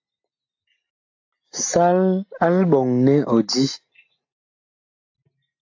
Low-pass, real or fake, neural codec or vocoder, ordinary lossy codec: 7.2 kHz; real; none; AAC, 48 kbps